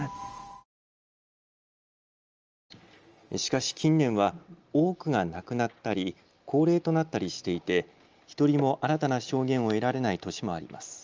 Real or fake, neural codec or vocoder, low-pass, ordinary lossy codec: real; none; 7.2 kHz; Opus, 24 kbps